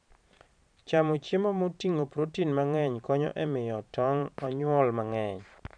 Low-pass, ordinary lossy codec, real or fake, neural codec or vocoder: 9.9 kHz; none; fake; vocoder, 44.1 kHz, 128 mel bands every 512 samples, BigVGAN v2